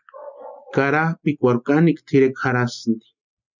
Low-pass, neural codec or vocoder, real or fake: 7.2 kHz; none; real